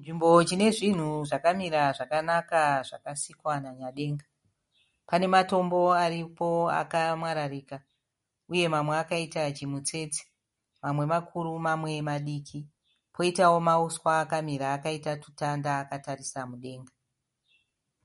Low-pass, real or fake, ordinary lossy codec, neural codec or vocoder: 14.4 kHz; real; MP3, 48 kbps; none